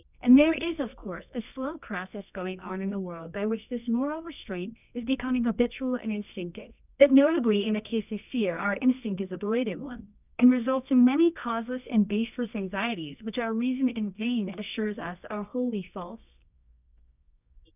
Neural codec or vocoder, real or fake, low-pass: codec, 24 kHz, 0.9 kbps, WavTokenizer, medium music audio release; fake; 3.6 kHz